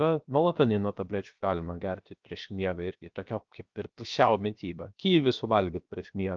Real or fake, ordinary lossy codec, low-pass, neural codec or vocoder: fake; Opus, 24 kbps; 7.2 kHz; codec, 16 kHz, 0.7 kbps, FocalCodec